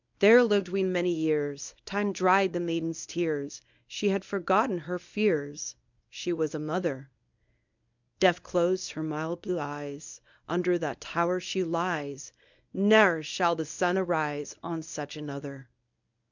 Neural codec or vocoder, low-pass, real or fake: codec, 24 kHz, 0.9 kbps, WavTokenizer, medium speech release version 1; 7.2 kHz; fake